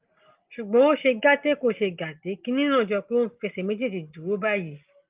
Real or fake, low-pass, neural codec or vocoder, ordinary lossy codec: real; 3.6 kHz; none; Opus, 32 kbps